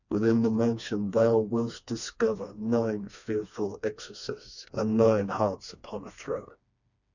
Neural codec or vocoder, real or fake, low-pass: codec, 16 kHz, 2 kbps, FreqCodec, smaller model; fake; 7.2 kHz